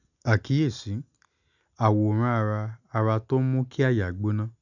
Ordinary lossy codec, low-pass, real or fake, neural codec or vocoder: none; 7.2 kHz; real; none